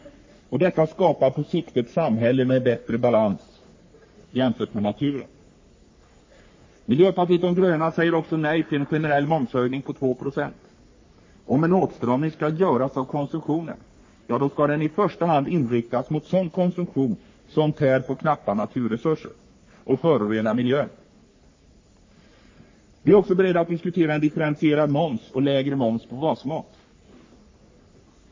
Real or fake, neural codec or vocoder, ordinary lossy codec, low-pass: fake; codec, 44.1 kHz, 3.4 kbps, Pupu-Codec; MP3, 32 kbps; 7.2 kHz